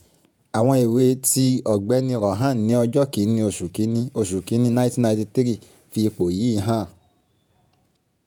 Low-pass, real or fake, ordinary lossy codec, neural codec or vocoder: none; fake; none; vocoder, 48 kHz, 128 mel bands, Vocos